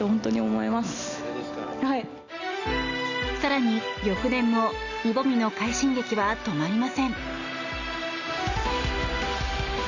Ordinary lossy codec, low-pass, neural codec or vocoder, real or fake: Opus, 64 kbps; 7.2 kHz; none; real